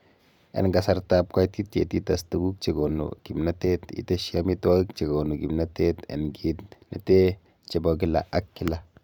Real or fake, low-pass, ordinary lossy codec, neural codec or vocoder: fake; 19.8 kHz; none; vocoder, 44.1 kHz, 128 mel bands every 512 samples, BigVGAN v2